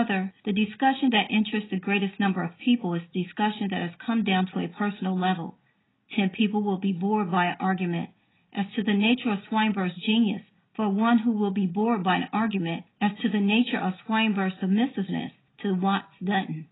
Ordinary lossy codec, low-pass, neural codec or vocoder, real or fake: AAC, 16 kbps; 7.2 kHz; none; real